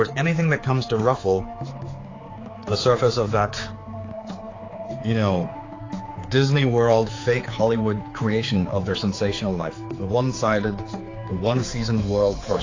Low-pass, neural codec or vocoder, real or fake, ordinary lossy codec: 7.2 kHz; codec, 16 kHz, 4 kbps, X-Codec, HuBERT features, trained on general audio; fake; AAC, 32 kbps